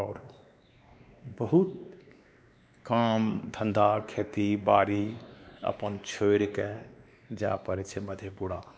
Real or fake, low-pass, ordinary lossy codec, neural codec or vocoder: fake; none; none; codec, 16 kHz, 2 kbps, X-Codec, WavLM features, trained on Multilingual LibriSpeech